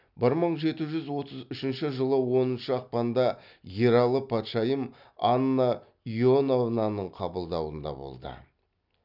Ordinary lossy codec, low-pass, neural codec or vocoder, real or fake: none; 5.4 kHz; none; real